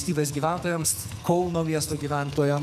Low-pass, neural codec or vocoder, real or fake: 14.4 kHz; codec, 44.1 kHz, 2.6 kbps, SNAC; fake